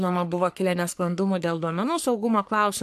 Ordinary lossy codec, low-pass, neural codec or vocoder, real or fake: AAC, 96 kbps; 14.4 kHz; codec, 44.1 kHz, 3.4 kbps, Pupu-Codec; fake